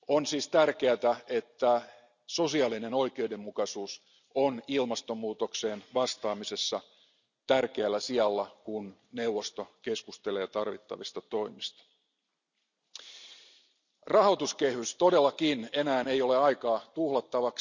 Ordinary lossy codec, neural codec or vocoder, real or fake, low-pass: none; none; real; 7.2 kHz